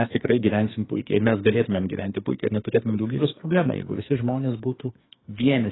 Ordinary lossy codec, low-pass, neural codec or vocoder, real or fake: AAC, 16 kbps; 7.2 kHz; codec, 44.1 kHz, 2.6 kbps, SNAC; fake